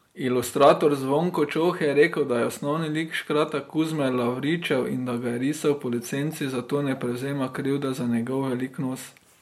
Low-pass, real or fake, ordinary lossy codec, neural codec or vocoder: 19.8 kHz; real; MP3, 64 kbps; none